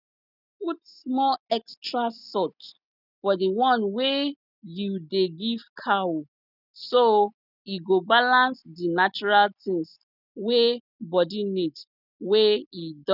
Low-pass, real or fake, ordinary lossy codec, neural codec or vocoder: 5.4 kHz; real; none; none